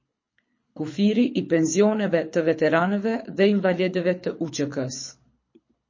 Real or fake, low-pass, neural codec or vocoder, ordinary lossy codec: fake; 7.2 kHz; codec, 24 kHz, 6 kbps, HILCodec; MP3, 32 kbps